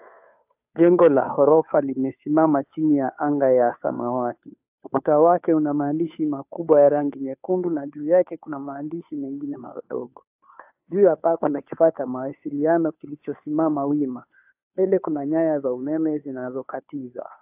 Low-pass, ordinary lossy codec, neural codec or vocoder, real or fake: 3.6 kHz; AAC, 32 kbps; codec, 16 kHz, 2 kbps, FunCodec, trained on Chinese and English, 25 frames a second; fake